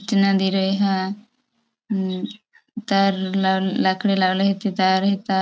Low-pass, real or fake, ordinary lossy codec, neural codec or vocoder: none; real; none; none